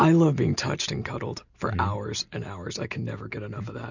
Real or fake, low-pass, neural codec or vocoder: real; 7.2 kHz; none